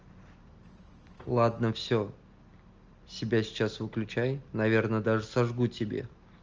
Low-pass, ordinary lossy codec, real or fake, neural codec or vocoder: 7.2 kHz; Opus, 24 kbps; real; none